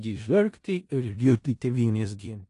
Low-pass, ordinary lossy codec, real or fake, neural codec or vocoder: 10.8 kHz; AAC, 48 kbps; fake; codec, 16 kHz in and 24 kHz out, 0.4 kbps, LongCat-Audio-Codec, four codebook decoder